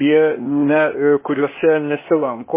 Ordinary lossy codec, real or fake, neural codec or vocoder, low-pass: MP3, 16 kbps; fake; codec, 16 kHz, 0.8 kbps, ZipCodec; 3.6 kHz